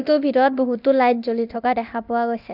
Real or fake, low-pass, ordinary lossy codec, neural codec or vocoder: fake; 5.4 kHz; none; codec, 24 kHz, 0.9 kbps, DualCodec